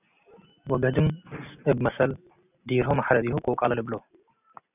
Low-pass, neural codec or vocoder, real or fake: 3.6 kHz; none; real